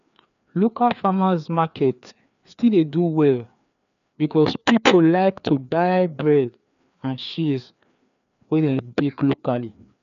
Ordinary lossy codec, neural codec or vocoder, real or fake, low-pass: none; codec, 16 kHz, 2 kbps, FreqCodec, larger model; fake; 7.2 kHz